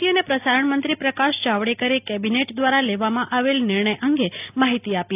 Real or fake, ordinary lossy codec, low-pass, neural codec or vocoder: real; AAC, 32 kbps; 3.6 kHz; none